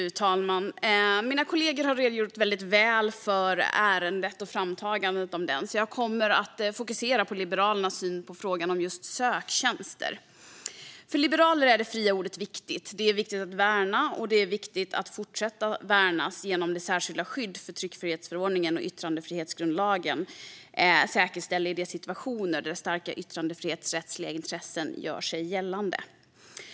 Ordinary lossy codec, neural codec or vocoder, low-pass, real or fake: none; none; none; real